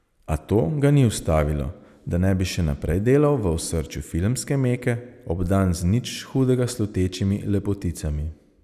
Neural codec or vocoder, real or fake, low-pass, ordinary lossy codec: none; real; 14.4 kHz; none